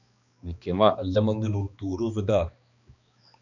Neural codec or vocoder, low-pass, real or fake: codec, 16 kHz, 2 kbps, X-Codec, HuBERT features, trained on balanced general audio; 7.2 kHz; fake